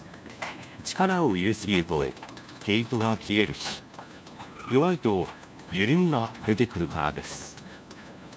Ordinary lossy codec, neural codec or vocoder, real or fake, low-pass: none; codec, 16 kHz, 1 kbps, FunCodec, trained on LibriTTS, 50 frames a second; fake; none